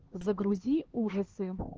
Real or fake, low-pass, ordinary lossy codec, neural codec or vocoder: fake; 7.2 kHz; Opus, 16 kbps; codec, 16 kHz, 2 kbps, X-Codec, HuBERT features, trained on LibriSpeech